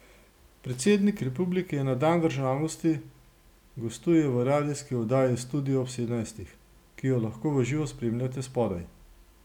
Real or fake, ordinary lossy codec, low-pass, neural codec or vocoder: real; none; 19.8 kHz; none